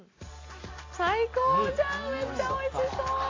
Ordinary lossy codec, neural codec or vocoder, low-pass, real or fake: AAC, 32 kbps; none; 7.2 kHz; real